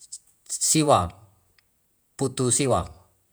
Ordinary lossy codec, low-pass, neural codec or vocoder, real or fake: none; none; vocoder, 48 kHz, 128 mel bands, Vocos; fake